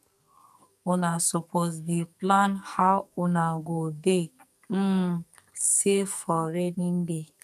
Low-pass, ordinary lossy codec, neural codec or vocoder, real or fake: 14.4 kHz; none; codec, 44.1 kHz, 2.6 kbps, SNAC; fake